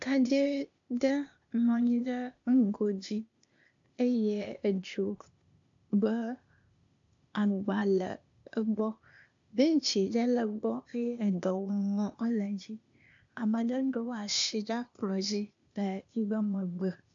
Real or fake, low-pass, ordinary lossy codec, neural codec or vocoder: fake; 7.2 kHz; AAC, 64 kbps; codec, 16 kHz, 0.8 kbps, ZipCodec